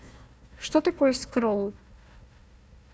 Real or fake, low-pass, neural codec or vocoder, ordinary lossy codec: fake; none; codec, 16 kHz, 1 kbps, FunCodec, trained on Chinese and English, 50 frames a second; none